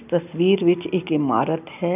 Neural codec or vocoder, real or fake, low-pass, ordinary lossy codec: none; real; 3.6 kHz; none